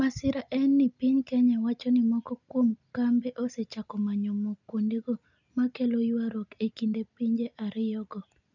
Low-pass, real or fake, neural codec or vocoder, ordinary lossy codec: 7.2 kHz; real; none; none